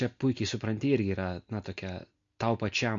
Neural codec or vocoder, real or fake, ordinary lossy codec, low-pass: none; real; MP3, 48 kbps; 7.2 kHz